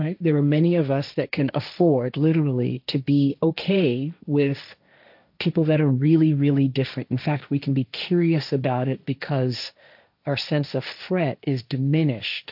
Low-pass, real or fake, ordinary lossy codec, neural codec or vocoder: 5.4 kHz; fake; AAC, 48 kbps; codec, 16 kHz, 1.1 kbps, Voila-Tokenizer